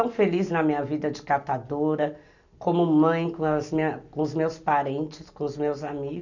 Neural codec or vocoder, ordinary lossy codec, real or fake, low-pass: none; none; real; 7.2 kHz